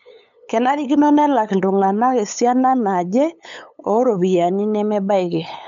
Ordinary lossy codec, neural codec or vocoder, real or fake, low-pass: none; codec, 16 kHz, 8 kbps, FunCodec, trained on LibriTTS, 25 frames a second; fake; 7.2 kHz